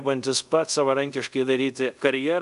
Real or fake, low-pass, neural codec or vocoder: fake; 10.8 kHz; codec, 24 kHz, 0.5 kbps, DualCodec